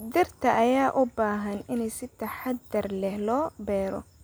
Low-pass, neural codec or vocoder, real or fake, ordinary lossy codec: none; none; real; none